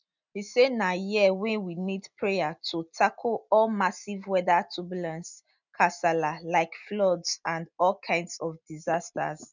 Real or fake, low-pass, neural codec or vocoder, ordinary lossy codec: real; 7.2 kHz; none; none